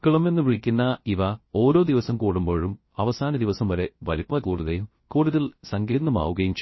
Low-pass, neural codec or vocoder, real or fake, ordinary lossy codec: 7.2 kHz; codec, 16 kHz, 0.3 kbps, FocalCodec; fake; MP3, 24 kbps